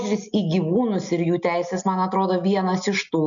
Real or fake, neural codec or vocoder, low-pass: real; none; 7.2 kHz